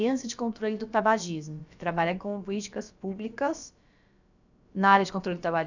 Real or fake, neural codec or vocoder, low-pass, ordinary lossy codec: fake; codec, 16 kHz, about 1 kbps, DyCAST, with the encoder's durations; 7.2 kHz; none